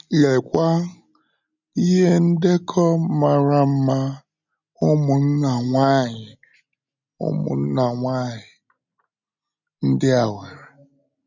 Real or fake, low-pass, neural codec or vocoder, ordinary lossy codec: real; 7.2 kHz; none; none